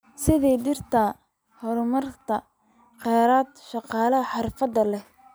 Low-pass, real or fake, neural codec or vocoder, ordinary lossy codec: none; real; none; none